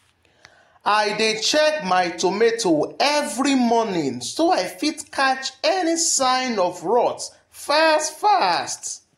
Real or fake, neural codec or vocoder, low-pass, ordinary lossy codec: real; none; 19.8 kHz; AAC, 48 kbps